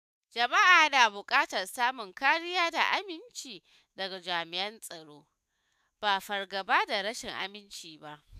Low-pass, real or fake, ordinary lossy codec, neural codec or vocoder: 14.4 kHz; fake; none; autoencoder, 48 kHz, 128 numbers a frame, DAC-VAE, trained on Japanese speech